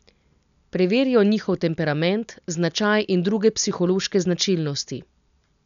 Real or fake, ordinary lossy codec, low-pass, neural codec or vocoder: real; none; 7.2 kHz; none